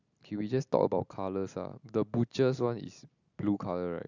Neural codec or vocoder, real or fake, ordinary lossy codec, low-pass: none; real; none; 7.2 kHz